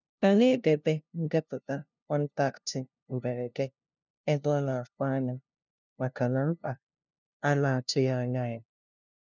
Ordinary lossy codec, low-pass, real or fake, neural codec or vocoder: none; 7.2 kHz; fake; codec, 16 kHz, 0.5 kbps, FunCodec, trained on LibriTTS, 25 frames a second